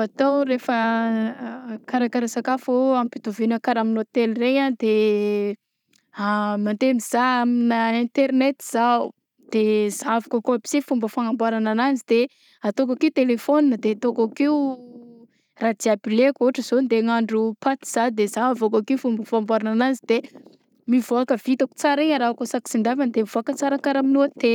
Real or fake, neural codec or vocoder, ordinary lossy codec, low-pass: real; none; none; 19.8 kHz